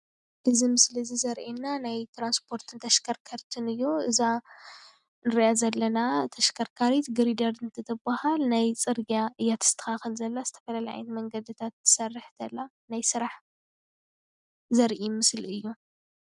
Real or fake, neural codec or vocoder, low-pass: real; none; 10.8 kHz